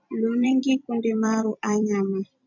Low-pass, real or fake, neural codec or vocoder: 7.2 kHz; fake; vocoder, 44.1 kHz, 128 mel bands every 256 samples, BigVGAN v2